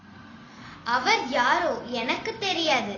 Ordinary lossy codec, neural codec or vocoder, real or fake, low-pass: AAC, 32 kbps; none; real; 7.2 kHz